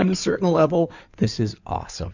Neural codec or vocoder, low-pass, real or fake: codec, 16 kHz in and 24 kHz out, 2.2 kbps, FireRedTTS-2 codec; 7.2 kHz; fake